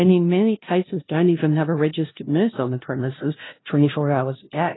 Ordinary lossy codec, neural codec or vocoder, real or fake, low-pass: AAC, 16 kbps; codec, 16 kHz, 0.5 kbps, FunCodec, trained on LibriTTS, 25 frames a second; fake; 7.2 kHz